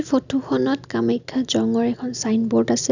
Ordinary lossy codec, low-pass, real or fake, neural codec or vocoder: none; 7.2 kHz; real; none